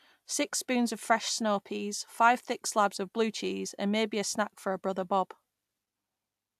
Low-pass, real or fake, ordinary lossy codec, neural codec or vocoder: 14.4 kHz; real; AAC, 96 kbps; none